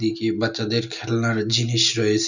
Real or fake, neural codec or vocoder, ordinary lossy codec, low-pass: real; none; none; 7.2 kHz